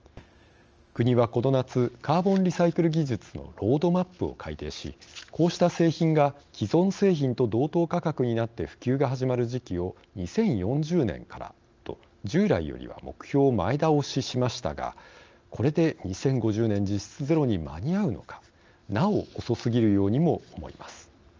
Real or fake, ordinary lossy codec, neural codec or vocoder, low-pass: real; Opus, 24 kbps; none; 7.2 kHz